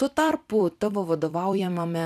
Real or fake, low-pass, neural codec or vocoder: fake; 14.4 kHz; vocoder, 44.1 kHz, 128 mel bands every 256 samples, BigVGAN v2